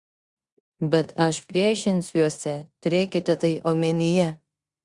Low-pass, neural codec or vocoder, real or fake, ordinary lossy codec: 10.8 kHz; codec, 16 kHz in and 24 kHz out, 0.9 kbps, LongCat-Audio-Codec, four codebook decoder; fake; Opus, 64 kbps